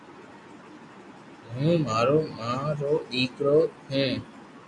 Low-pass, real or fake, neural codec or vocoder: 10.8 kHz; real; none